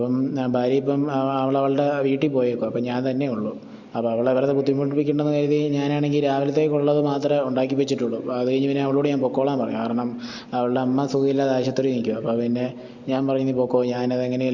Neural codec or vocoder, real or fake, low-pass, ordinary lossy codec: none; real; 7.2 kHz; Opus, 64 kbps